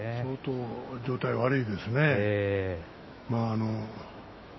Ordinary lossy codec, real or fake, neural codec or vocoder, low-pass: MP3, 24 kbps; fake; autoencoder, 48 kHz, 128 numbers a frame, DAC-VAE, trained on Japanese speech; 7.2 kHz